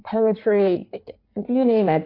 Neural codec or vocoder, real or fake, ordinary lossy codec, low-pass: codec, 16 kHz in and 24 kHz out, 1.1 kbps, FireRedTTS-2 codec; fake; AAC, 32 kbps; 5.4 kHz